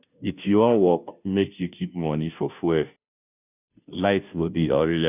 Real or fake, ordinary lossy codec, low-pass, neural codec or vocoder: fake; none; 3.6 kHz; codec, 16 kHz, 0.5 kbps, FunCodec, trained on Chinese and English, 25 frames a second